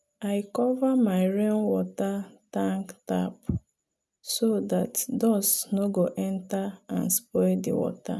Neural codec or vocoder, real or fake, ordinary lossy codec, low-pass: none; real; none; none